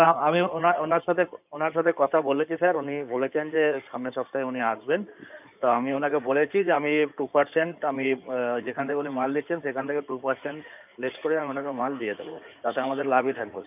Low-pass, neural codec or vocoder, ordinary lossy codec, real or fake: 3.6 kHz; codec, 16 kHz in and 24 kHz out, 2.2 kbps, FireRedTTS-2 codec; none; fake